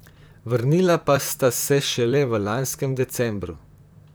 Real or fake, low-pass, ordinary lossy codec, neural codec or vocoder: fake; none; none; vocoder, 44.1 kHz, 128 mel bands, Pupu-Vocoder